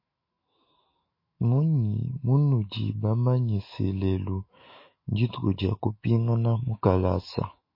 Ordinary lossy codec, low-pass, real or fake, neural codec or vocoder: MP3, 24 kbps; 5.4 kHz; fake; autoencoder, 48 kHz, 128 numbers a frame, DAC-VAE, trained on Japanese speech